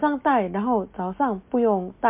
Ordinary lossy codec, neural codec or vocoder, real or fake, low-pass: MP3, 32 kbps; none; real; 3.6 kHz